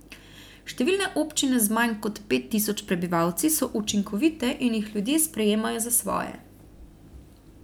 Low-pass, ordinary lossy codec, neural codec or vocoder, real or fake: none; none; none; real